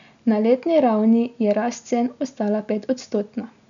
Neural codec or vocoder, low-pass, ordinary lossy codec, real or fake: none; 7.2 kHz; none; real